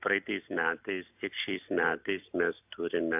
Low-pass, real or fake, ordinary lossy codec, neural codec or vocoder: 3.6 kHz; real; AAC, 32 kbps; none